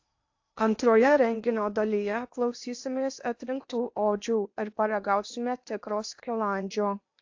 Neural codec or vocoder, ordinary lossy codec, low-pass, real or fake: codec, 16 kHz in and 24 kHz out, 0.8 kbps, FocalCodec, streaming, 65536 codes; AAC, 48 kbps; 7.2 kHz; fake